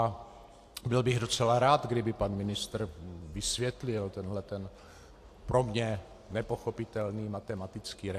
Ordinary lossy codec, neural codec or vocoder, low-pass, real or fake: AAC, 64 kbps; none; 14.4 kHz; real